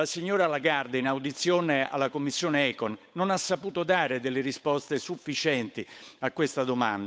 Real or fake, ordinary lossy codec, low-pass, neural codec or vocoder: fake; none; none; codec, 16 kHz, 8 kbps, FunCodec, trained on Chinese and English, 25 frames a second